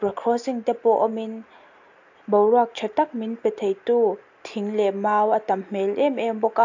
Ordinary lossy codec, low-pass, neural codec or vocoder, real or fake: AAC, 48 kbps; 7.2 kHz; none; real